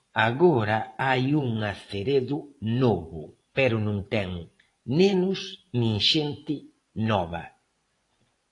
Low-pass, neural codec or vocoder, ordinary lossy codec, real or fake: 10.8 kHz; vocoder, 24 kHz, 100 mel bands, Vocos; AAC, 48 kbps; fake